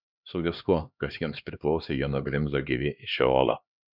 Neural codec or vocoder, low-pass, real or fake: codec, 16 kHz, 2 kbps, X-Codec, HuBERT features, trained on LibriSpeech; 5.4 kHz; fake